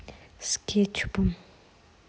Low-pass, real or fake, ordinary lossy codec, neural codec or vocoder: none; real; none; none